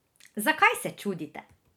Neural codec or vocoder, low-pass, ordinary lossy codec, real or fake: none; none; none; real